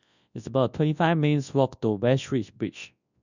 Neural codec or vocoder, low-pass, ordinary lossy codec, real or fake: codec, 24 kHz, 0.9 kbps, WavTokenizer, large speech release; 7.2 kHz; none; fake